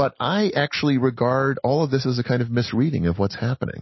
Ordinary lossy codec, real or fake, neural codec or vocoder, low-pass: MP3, 24 kbps; real; none; 7.2 kHz